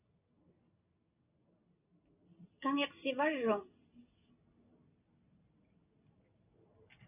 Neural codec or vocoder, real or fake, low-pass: none; real; 3.6 kHz